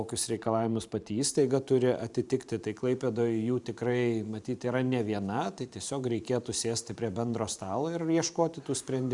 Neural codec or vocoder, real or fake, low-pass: vocoder, 44.1 kHz, 128 mel bands every 512 samples, BigVGAN v2; fake; 10.8 kHz